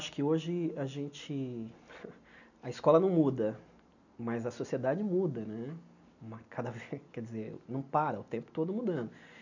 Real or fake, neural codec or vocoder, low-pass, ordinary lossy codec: real; none; 7.2 kHz; none